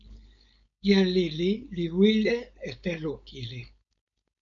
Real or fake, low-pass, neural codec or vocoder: fake; 7.2 kHz; codec, 16 kHz, 4.8 kbps, FACodec